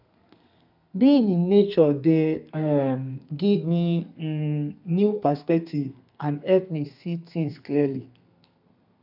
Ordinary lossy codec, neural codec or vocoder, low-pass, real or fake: none; codec, 32 kHz, 1.9 kbps, SNAC; 5.4 kHz; fake